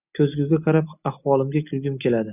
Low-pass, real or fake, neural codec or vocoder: 3.6 kHz; real; none